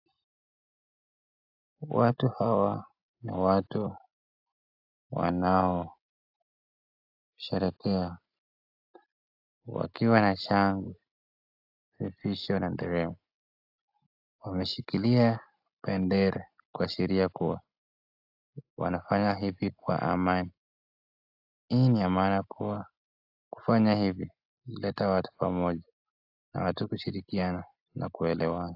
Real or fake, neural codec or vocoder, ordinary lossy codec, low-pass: real; none; AAC, 48 kbps; 5.4 kHz